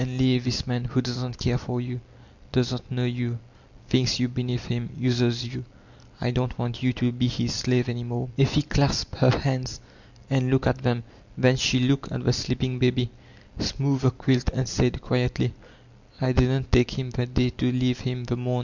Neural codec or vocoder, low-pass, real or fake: none; 7.2 kHz; real